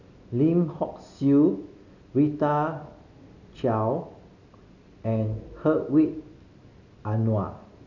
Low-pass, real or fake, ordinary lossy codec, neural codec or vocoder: 7.2 kHz; real; none; none